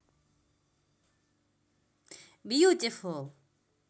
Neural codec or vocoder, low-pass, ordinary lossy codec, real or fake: none; none; none; real